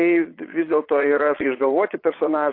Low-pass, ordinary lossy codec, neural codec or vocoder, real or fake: 5.4 kHz; AAC, 32 kbps; vocoder, 22.05 kHz, 80 mel bands, Vocos; fake